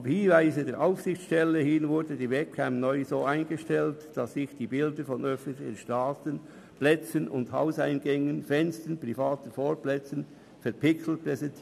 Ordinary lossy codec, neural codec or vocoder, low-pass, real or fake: none; none; 14.4 kHz; real